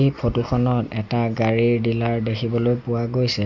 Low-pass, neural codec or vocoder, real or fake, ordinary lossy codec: 7.2 kHz; none; real; none